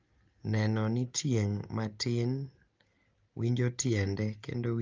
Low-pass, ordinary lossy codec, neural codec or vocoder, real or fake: 7.2 kHz; Opus, 32 kbps; none; real